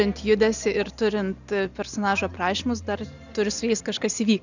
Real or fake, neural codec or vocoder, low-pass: real; none; 7.2 kHz